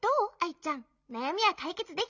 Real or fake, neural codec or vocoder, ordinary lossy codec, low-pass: real; none; none; 7.2 kHz